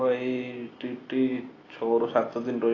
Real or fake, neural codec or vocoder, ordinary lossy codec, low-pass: real; none; AAC, 32 kbps; 7.2 kHz